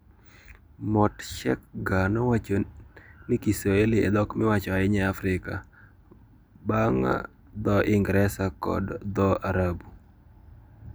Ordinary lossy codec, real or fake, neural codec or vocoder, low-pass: none; real; none; none